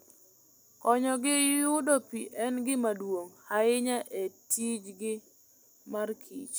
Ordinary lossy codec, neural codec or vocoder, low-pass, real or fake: none; none; none; real